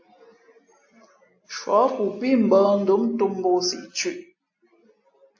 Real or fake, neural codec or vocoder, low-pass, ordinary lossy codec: real; none; 7.2 kHz; AAC, 48 kbps